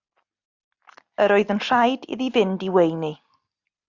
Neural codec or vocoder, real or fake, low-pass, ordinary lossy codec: none; real; 7.2 kHz; Opus, 64 kbps